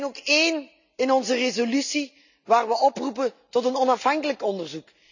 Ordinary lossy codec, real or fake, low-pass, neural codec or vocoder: none; real; 7.2 kHz; none